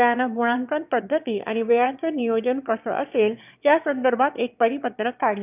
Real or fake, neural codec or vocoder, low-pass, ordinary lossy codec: fake; autoencoder, 22.05 kHz, a latent of 192 numbers a frame, VITS, trained on one speaker; 3.6 kHz; none